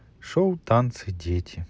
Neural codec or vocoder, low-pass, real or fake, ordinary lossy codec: none; none; real; none